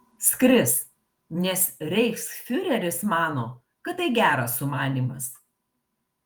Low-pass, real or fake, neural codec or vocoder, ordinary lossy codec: 19.8 kHz; fake; vocoder, 48 kHz, 128 mel bands, Vocos; Opus, 32 kbps